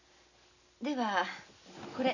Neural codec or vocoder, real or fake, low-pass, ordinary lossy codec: none; real; 7.2 kHz; none